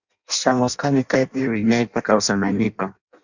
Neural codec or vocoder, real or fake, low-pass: codec, 16 kHz in and 24 kHz out, 0.6 kbps, FireRedTTS-2 codec; fake; 7.2 kHz